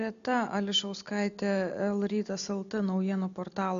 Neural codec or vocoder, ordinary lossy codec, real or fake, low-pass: none; MP3, 64 kbps; real; 7.2 kHz